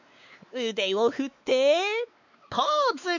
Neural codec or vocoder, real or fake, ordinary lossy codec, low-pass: codec, 16 kHz, 4 kbps, X-Codec, WavLM features, trained on Multilingual LibriSpeech; fake; none; 7.2 kHz